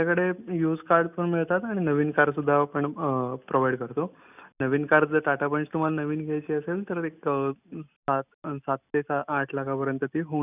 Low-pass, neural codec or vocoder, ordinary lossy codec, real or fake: 3.6 kHz; none; none; real